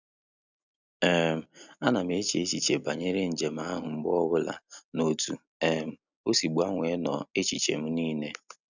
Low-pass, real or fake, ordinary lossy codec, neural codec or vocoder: 7.2 kHz; real; none; none